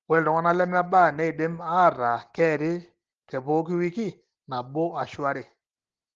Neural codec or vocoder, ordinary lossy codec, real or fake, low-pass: none; Opus, 16 kbps; real; 7.2 kHz